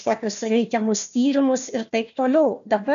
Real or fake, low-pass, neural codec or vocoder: fake; 7.2 kHz; codec, 16 kHz, 1 kbps, FunCodec, trained on Chinese and English, 50 frames a second